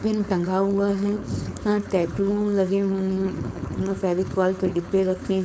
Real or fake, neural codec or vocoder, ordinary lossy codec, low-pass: fake; codec, 16 kHz, 4.8 kbps, FACodec; none; none